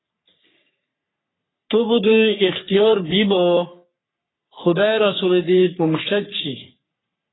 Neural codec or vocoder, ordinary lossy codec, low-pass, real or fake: codec, 44.1 kHz, 3.4 kbps, Pupu-Codec; AAC, 16 kbps; 7.2 kHz; fake